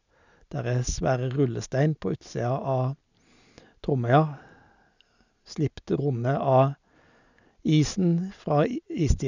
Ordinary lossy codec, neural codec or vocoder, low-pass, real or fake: none; none; 7.2 kHz; real